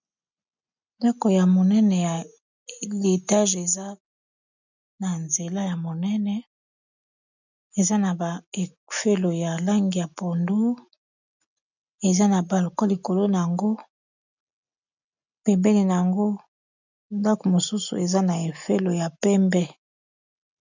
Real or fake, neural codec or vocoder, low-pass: real; none; 7.2 kHz